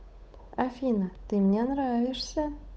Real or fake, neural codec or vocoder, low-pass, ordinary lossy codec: fake; codec, 16 kHz, 8 kbps, FunCodec, trained on Chinese and English, 25 frames a second; none; none